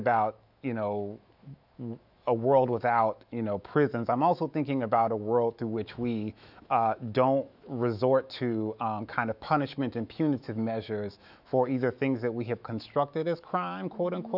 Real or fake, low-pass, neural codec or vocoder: fake; 5.4 kHz; autoencoder, 48 kHz, 128 numbers a frame, DAC-VAE, trained on Japanese speech